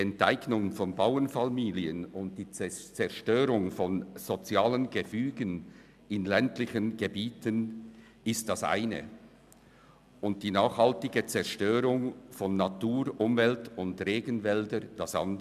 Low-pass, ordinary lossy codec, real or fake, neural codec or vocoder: 14.4 kHz; none; real; none